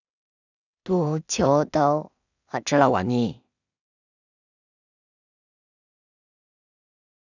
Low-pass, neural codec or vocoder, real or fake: 7.2 kHz; codec, 16 kHz in and 24 kHz out, 0.4 kbps, LongCat-Audio-Codec, two codebook decoder; fake